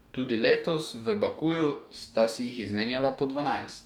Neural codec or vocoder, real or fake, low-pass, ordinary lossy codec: codec, 44.1 kHz, 2.6 kbps, DAC; fake; 19.8 kHz; none